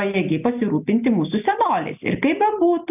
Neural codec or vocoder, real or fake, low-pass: none; real; 3.6 kHz